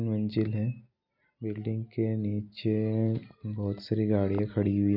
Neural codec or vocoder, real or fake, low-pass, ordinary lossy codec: none; real; 5.4 kHz; none